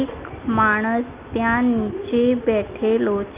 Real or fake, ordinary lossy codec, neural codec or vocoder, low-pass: real; Opus, 32 kbps; none; 3.6 kHz